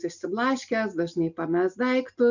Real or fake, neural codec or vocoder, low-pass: real; none; 7.2 kHz